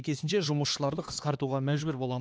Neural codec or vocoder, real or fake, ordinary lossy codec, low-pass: codec, 16 kHz, 2 kbps, X-Codec, HuBERT features, trained on LibriSpeech; fake; none; none